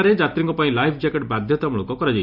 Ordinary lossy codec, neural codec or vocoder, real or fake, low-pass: none; none; real; 5.4 kHz